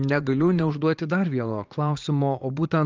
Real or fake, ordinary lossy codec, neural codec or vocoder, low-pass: fake; Opus, 24 kbps; vocoder, 44.1 kHz, 80 mel bands, Vocos; 7.2 kHz